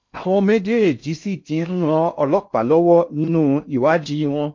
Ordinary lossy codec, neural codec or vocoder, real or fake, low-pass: MP3, 48 kbps; codec, 16 kHz in and 24 kHz out, 0.6 kbps, FocalCodec, streaming, 2048 codes; fake; 7.2 kHz